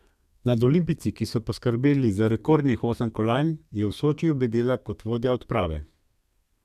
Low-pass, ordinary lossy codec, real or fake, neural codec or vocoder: 14.4 kHz; none; fake; codec, 32 kHz, 1.9 kbps, SNAC